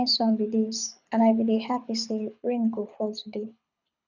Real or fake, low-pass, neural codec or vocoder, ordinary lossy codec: fake; 7.2 kHz; codec, 24 kHz, 6 kbps, HILCodec; none